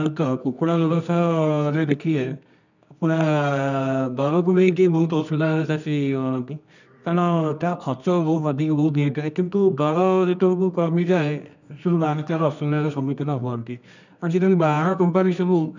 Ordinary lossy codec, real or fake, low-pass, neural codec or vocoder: none; fake; 7.2 kHz; codec, 24 kHz, 0.9 kbps, WavTokenizer, medium music audio release